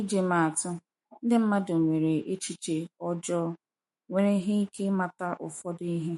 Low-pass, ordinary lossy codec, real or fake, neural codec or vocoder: 19.8 kHz; MP3, 48 kbps; fake; autoencoder, 48 kHz, 128 numbers a frame, DAC-VAE, trained on Japanese speech